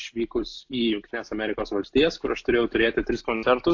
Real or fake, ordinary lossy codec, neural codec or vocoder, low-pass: real; AAC, 48 kbps; none; 7.2 kHz